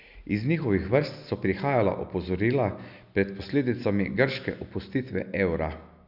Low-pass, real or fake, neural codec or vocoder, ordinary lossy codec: 5.4 kHz; real; none; none